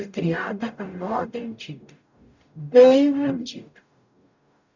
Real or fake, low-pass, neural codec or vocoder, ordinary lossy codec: fake; 7.2 kHz; codec, 44.1 kHz, 0.9 kbps, DAC; none